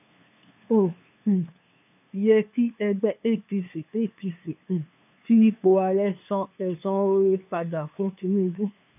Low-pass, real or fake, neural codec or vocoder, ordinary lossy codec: 3.6 kHz; fake; codec, 16 kHz, 2 kbps, FunCodec, trained on LibriTTS, 25 frames a second; none